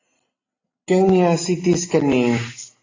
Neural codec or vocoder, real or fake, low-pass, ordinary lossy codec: none; real; 7.2 kHz; AAC, 32 kbps